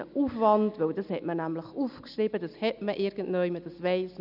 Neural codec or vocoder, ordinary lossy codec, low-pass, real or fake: none; none; 5.4 kHz; real